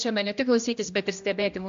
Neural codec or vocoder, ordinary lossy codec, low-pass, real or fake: codec, 16 kHz, 1 kbps, X-Codec, HuBERT features, trained on general audio; AAC, 48 kbps; 7.2 kHz; fake